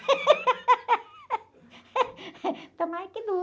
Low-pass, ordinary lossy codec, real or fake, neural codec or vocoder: none; none; real; none